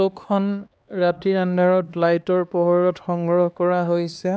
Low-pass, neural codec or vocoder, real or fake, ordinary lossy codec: none; codec, 16 kHz, 2 kbps, X-Codec, HuBERT features, trained on LibriSpeech; fake; none